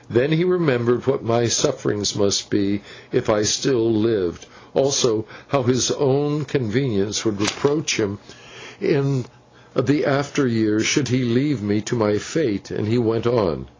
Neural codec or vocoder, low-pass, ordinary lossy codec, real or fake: none; 7.2 kHz; AAC, 32 kbps; real